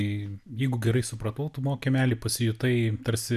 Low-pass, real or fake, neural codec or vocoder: 14.4 kHz; real; none